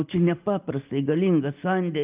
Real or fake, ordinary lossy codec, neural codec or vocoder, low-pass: real; Opus, 24 kbps; none; 3.6 kHz